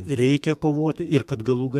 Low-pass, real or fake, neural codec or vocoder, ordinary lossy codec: 14.4 kHz; fake; codec, 32 kHz, 1.9 kbps, SNAC; MP3, 96 kbps